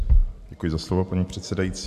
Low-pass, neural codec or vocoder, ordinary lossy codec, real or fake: 14.4 kHz; none; MP3, 64 kbps; real